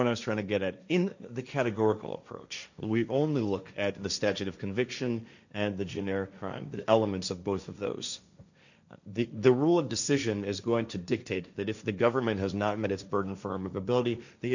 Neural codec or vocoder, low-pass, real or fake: codec, 16 kHz, 1.1 kbps, Voila-Tokenizer; 7.2 kHz; fake